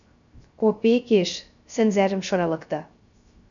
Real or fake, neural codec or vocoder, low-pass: fake; codec, 16 kHz, 0.3 kbps, FocalCodec; 7.2 kHz